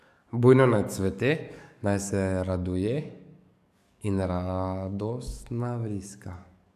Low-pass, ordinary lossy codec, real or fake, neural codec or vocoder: 14.4 kHz; none; fake; codec, 44.1 kHz, 7.8 kbps, DAC